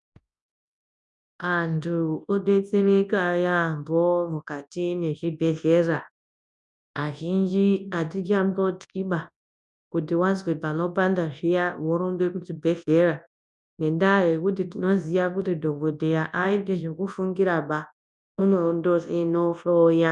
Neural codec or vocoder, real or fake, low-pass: codec, 24 kHz, 0.9 kbps, WavTokenizer, large speech release; fake; 10.8 kHz